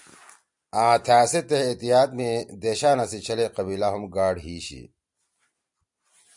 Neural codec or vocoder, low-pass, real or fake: none; 10.8 kHz; real